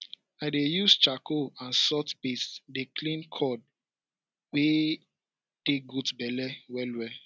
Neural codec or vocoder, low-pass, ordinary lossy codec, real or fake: none; none; none; real